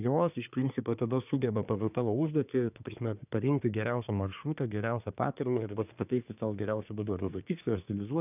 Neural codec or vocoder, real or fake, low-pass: codec, 24 kHz, 1 kbps, SNAC; fake; 3.6 kHz